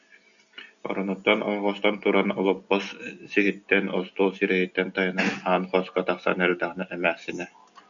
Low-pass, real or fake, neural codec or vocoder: 7.2 kHz; real; none